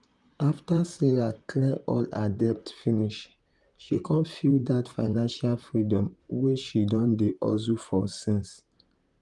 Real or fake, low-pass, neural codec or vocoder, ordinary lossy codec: fake; 10.8 kHz; vocoder, 44.1 kHz, 128 mel bands, Pupu-Vocoder; Opus, 32 kbps